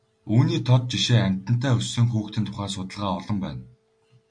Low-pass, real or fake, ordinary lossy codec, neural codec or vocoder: 9.9 kHz; real; MP3, 64 kbps; none